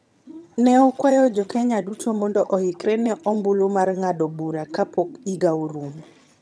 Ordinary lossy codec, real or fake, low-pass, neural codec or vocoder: none; fake; none; vocoder, 22.05 kHz, 80 mel bands, HiFi-GAN